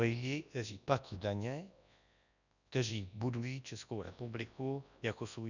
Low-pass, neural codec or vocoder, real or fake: 7.2 kHz; codec, 24 kHz, 0.9 kbps, WavTokenizer, large speech release; fake